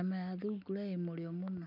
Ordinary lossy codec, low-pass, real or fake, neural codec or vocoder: none; 5.4 kHz; real; none